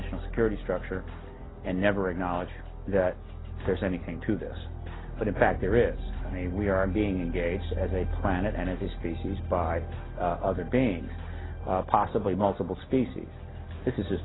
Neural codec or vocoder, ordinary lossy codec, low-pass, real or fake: none; AAC, 16 kbps; 7.2 kHz; real